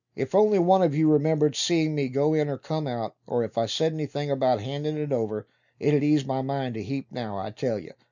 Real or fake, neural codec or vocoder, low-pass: real; none; 7.2 kHz